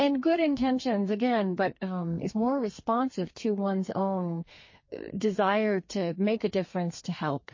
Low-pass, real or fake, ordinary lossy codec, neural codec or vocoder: 7.2 kHz; fake; MP3, 32 kbps; codec, 32 kHz, 1.9 kbps, SNAC